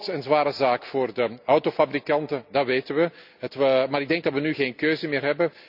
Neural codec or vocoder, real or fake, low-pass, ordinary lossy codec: none; real; 5.4 kHz; none